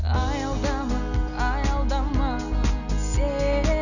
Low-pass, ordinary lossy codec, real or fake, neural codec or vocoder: 7.2 kHz; none; real; none